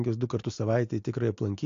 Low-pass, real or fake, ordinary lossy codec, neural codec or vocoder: 7.2 kHz; real; AAC, 48 kbps; none